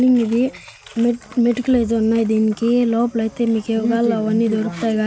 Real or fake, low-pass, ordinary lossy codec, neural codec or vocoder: real; none; none; none